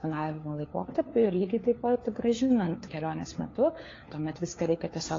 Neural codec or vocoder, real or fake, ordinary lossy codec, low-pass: codec, 16 kHz, 4 kbps, FunCodec, trained on LibriTTS, 50 frames a second; fake; AAC, 32 kbps; 7.2 kHz